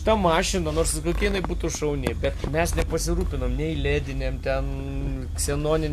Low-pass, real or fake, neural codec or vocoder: 14.4 kHz; real; none